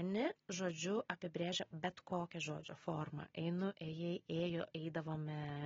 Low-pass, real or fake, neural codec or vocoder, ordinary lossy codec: 7.2 kHz; real; none; AAC, 24 kbps